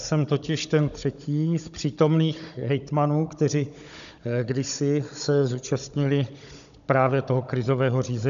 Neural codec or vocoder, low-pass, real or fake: codec, 16 kHz, 16 kbps, FunCodec, trained on Chinese and English, 50 frames a second; 7.2 kHz; fake